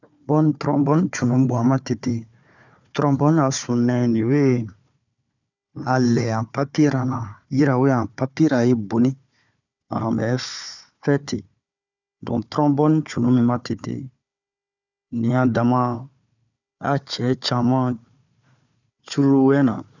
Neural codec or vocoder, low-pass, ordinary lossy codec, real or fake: codec, 16 kHz, 4 kbps, FunCodec, trained on Chinese and English, 50 frames a second; 7.2 kHz; none; fake